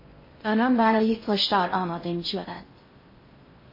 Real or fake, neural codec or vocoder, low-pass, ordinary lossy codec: fake; codec, 16 kHz in and 24 kHz out, 0.6 kbps, FocalCodec, streaming, 2048 codes; 5.4 kHz; MP3, 24 kbps